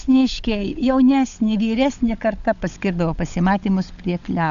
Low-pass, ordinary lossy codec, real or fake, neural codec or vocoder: 7.2 kHz; AAC, 96 kbps; fake; codec, 16 kHz, 16 kbps, FunCodec, trained on LibriTTS, 50 frames a second